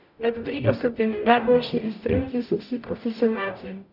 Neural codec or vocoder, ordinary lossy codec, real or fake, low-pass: codec, 44.1 kHz, 0.9 kbps, DAC; none; fake; 5.4 kHz